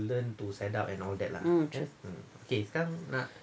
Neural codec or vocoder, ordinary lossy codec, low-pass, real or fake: none; none; none; real